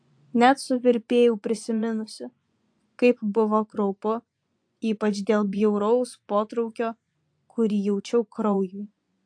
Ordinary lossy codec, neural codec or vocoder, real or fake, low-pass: AAC, 64 kbps; vocoder, 24 kHz, 100 mel bands, Vocos; fake; 9.9 kHz